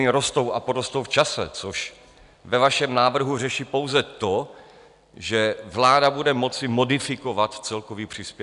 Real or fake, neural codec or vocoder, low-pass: real; none; 10.8 kHz